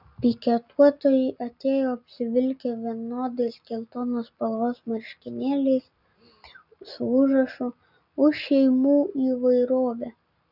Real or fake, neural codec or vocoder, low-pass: real; none; 5.4 kHz